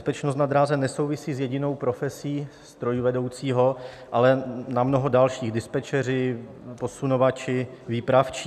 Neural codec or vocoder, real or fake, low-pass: none; real; 14.4 kHz